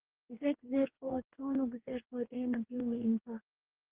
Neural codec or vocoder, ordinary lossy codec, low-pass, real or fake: codec, 44.1 kHz, 2.6 kbps, DAC; Opus, 16 kbps; 3.6 kHz; fake